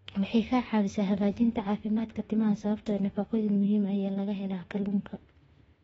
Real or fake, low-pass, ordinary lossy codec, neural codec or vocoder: fake; 19.8 kHz; AAC, 24 kbps; autoencoder, 48 kHz, 32 numbers a frame, DAC-VAE, trained on Japanese speech